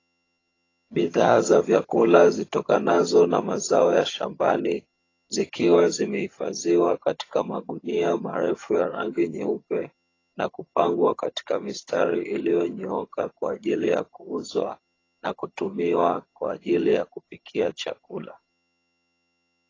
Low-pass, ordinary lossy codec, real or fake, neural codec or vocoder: 7.2 kHz; AAC, 32 kbps; fake; vocoder, 22.05 kHz, 80 mel bands, HiFi-GAN